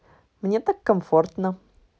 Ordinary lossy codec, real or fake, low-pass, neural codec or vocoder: none; real; none; none